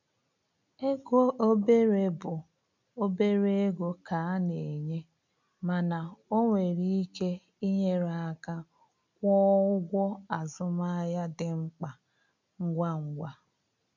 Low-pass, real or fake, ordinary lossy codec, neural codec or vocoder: 7.2 kHz; real; none; none